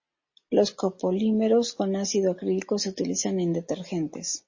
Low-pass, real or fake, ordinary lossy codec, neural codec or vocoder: 7.2 kHz; real; MP3, 32 kbps; none